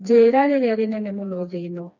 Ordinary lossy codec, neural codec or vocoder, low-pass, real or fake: none; codec, 16 kHz, 2 kbps, FreqCodec, smaller model; 7.2 kHz; fake